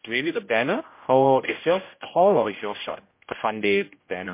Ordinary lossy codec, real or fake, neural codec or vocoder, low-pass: MP3, 24 kbps; fake; codec, 16 kHz, 0.5 kbps, X-Codec, HuBERT features, trained on general audio; 3.6 kHz